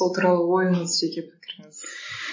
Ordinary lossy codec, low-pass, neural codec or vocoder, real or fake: MP3, 32 kbps; 7.2 kHz; none; real